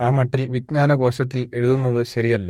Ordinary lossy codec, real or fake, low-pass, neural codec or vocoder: MP3, 64 kbps; fake; 19.8 kHz; codec, 44.1 kHz, 2.6 kbps, DAC